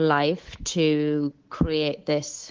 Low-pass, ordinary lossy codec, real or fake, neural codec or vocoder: 7.2 kHz; Opus, 16 kbps; fake; codec, 24 kHz, 3.1 kbps, DualCodec